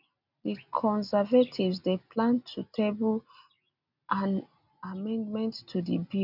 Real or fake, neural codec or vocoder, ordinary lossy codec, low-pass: real; none; none; 5.4 kHz